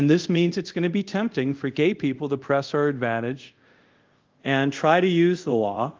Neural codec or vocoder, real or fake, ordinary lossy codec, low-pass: codec, 24 kHz, 0.5 kbps, DualCodec; fake; Opus, 32 kbps; 7.2 kHz